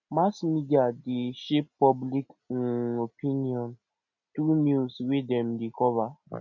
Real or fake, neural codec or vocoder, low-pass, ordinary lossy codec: real; none; 7.2 kHz; none